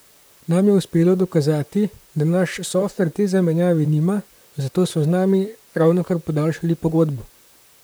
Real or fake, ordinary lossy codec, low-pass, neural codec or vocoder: fake; none; none; vocoder, 44.1 kHz, 128 mel bands, Pupu-Vocoder